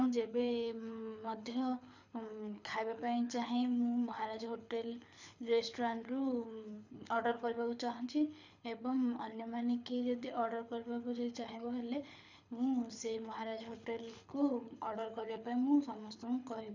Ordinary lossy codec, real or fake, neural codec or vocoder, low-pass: none; fake; codec, 24 kHz, 6 kbps, HILCodec; 7.2 kHz